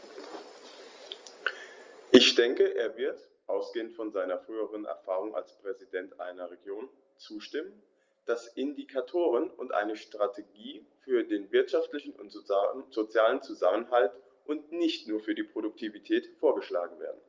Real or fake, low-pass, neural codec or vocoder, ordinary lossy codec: real; 7.2 kHz; none; Opus, 32 kbps